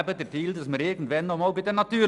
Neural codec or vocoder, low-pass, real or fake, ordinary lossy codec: none; 10.8 kHz; real; none